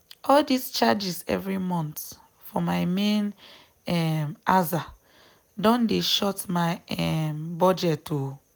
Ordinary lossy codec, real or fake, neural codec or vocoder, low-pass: none; real; none; none